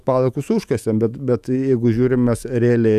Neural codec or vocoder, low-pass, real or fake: autoencoder, 48 kHz, 128 numbers a frame, DAC-VAE, trained on Japanese speech; 14.4 kHz; fake